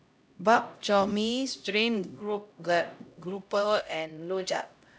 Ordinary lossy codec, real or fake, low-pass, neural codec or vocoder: none; fake; none; codec, 16 kHz, 0.5 kbps, X-Codec, HuBERT features, trained on LibriSpeech